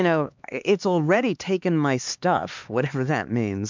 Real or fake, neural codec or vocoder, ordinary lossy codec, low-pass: fake; codec, 16 kHz, 2 kbps, X-Codec, HuBERT features, trained on LibriSpeech; MP3, 64 kbps; 7.2 kHz